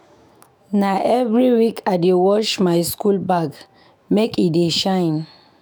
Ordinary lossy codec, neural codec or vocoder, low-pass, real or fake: none; autoencoder, 48 kHz, 128 numbers a frame, DAC-VAE, trained on Japanese speech; none; fake